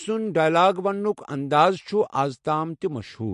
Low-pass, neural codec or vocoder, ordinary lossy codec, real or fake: 14.4 kHz; none; MP3, 48 kbps; real